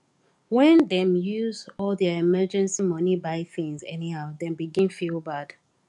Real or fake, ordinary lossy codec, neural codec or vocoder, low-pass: fake; none; codec, 44.1 kHz, 7.8 kbps, DAC; 10.8 kHz